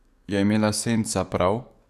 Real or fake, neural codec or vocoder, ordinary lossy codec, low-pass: fake; codec, 44.1 kHz, 7.8 kbps, DAC; none; 14.4 kHz